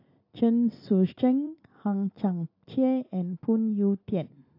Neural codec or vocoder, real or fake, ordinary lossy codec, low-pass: vocoder, 44.1 kHz, 128 mel bands every 256 samples, BigVGAN v2; fake; AAC, 32 kbps; 5.4 kHz